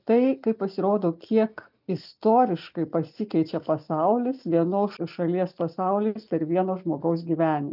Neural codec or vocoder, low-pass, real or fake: none; 5.4 kHz; real